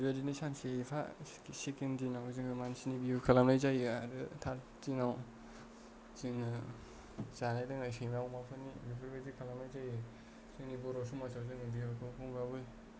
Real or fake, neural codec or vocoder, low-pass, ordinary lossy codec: real; none; none; none